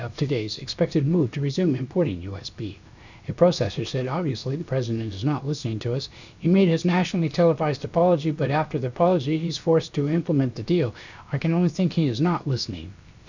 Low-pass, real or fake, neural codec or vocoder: 7.2 kHz; fake; codec, 16 kHz, about 1 kbps, DyCAST, with the encoder's durations